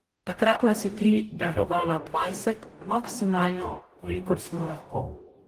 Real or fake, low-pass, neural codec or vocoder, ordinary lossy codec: fake; 14.4 kHz; codec, 44.1 kHz, 0.9 kbps, DAC; Opus, 24 kbps